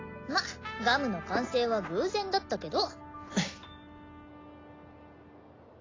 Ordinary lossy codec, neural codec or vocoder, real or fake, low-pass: AAC, 32 kbps; none; real; 7.2 kHz